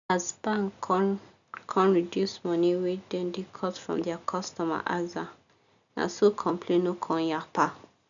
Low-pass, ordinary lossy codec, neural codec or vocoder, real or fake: 7.2 kHz; none; none; real